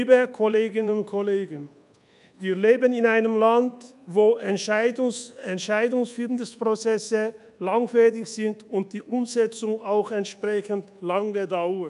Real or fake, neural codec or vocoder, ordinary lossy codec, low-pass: fake; codec, 24 kHz, 1.2 kbps, DualCodec; none; 10.8 kHz